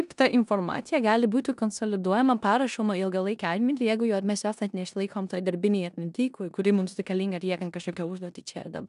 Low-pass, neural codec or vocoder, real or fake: 10.8 kHz; codec, 16 kHz in and 24 kHz out, 0.9 kbps, LongCat-Audio-Codec, four codebook decoder; fake